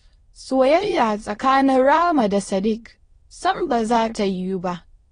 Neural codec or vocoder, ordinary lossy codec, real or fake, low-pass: autoencoder, 22.05 kHz, a latent of 192 numbers a frame, VITS, trained on many speakers; AAC, 32 kbps; fake; 9.9 kHz